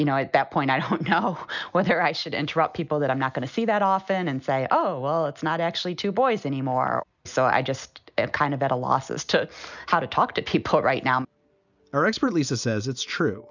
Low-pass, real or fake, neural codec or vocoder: 7.2 kHz; real; none